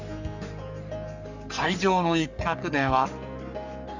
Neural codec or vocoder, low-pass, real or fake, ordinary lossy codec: codec, 44.1 kHz, 3.4 kbps, Pupu-Codec; 7.2 kHz; fake; none